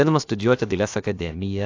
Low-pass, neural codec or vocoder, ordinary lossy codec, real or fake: 7.2 kHz; codec, 16 kHz, about 1 kbps, DyCAST, with the encoder's durations; AAC, 48 kbps; fake